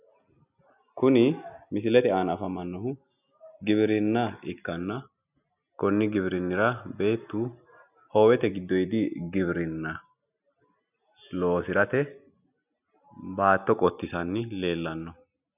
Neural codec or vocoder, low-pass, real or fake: none; 3.6 kHz; real